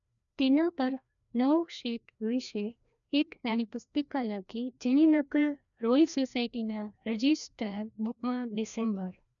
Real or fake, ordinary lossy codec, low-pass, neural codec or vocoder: fake; none; 7.2 kHz; codec, 16 kHz, 1 kbps, FreqCodec, larger model